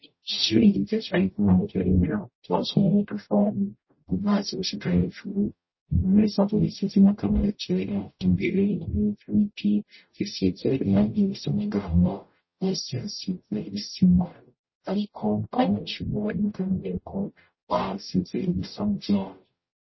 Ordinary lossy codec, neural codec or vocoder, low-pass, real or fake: MP3, 24 kbps; codec, 44.1 kHz, 0.9 kbps, DAC; 7.2 kHz; fake